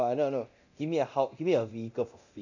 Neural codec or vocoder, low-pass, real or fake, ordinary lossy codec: codec, 24 kHz, 0.9 kbps, DualCodec; 7.2 kHz; fake; none